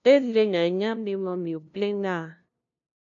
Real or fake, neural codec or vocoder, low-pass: fake; codec, 16 kHz, 0.5 kbps, FunCodec, trained on LibriTTS, 25 frames a second; 7.2 kHz